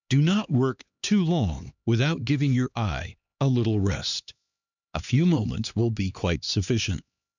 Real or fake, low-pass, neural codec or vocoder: fake; 7.2 kHz; codec, 16 kHz, 2 kbps, X-Codec, HuBERT features, trained on LibriSpeech